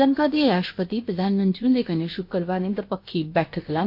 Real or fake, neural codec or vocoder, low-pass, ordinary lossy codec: fake; codec, 16 kHz, about 1 kbps, DyCAST, with the encoder's durations; 5.4 kHz; MP3, 32 kbps